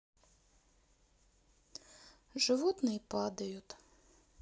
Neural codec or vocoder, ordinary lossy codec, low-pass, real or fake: none; none; none; real